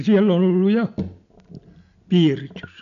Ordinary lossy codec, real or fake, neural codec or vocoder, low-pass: MP3, 96 kbps; real; none; 7.2 kHz